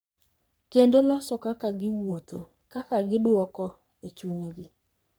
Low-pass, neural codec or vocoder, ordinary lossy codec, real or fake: none; codec, 44.1 kHz, 3.4 kbps, Pupu-Codec; none; fake